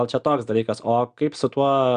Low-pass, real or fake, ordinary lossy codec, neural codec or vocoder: 9.9 kHz; real; Opus, 64 kbps; none